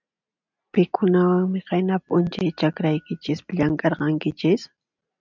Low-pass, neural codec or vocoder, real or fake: 7.2 kHz; none; real